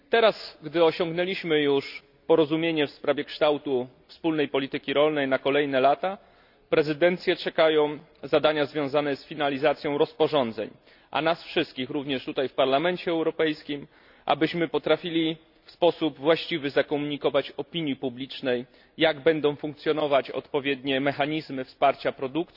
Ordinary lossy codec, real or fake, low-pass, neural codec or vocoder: none; real; 5.4 kHz; none